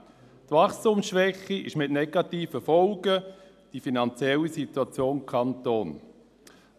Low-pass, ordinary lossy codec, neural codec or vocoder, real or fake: 14.4 kHz; none; none; real